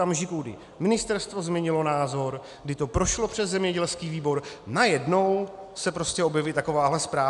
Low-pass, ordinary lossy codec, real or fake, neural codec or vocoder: 10.8 kHz; MP3, 96 kbps; real; none